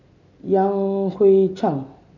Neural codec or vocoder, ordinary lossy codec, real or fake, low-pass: none; none; real; 7.2 kHz